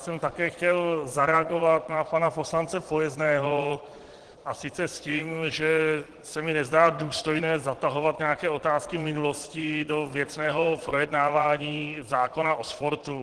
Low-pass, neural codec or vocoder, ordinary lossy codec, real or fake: 9.9 kHz; vocoder, 22.05 kHz, 80 mel bands, Vocos; Opus, 16 kbps; fake